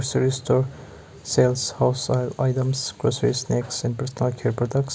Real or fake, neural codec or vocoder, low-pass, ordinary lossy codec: real; none; none; none